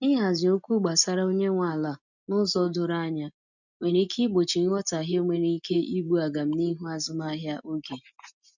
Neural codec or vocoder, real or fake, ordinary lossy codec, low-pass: none; real; none; 7.2 kHz